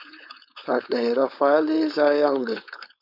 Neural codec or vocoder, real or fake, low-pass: codec, 16 kHz, 4.8 kbps, FACodec; fake; 5.4 kHz